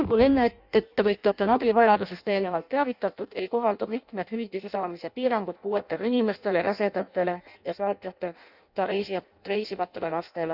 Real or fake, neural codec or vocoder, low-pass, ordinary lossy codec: fake; codec, 16 kHz in and 24 kHz out, 0.6 kbps, FireRedTTS-2 codec; 5.4 kHz; none